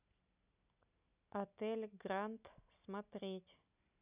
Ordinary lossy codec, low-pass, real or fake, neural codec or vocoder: none; 3.6 kHz; real; none